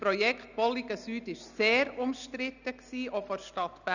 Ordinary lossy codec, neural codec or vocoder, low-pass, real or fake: none; none; 7.2 kHz; real